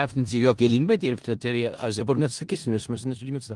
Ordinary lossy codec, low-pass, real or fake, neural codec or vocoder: Opus, 24 kbps; 10.8 kHz; fake; codec, 16 kHz in and 24 kHz out, 0.4 kbps, LongCat-Audio-Codec, four codebook decoder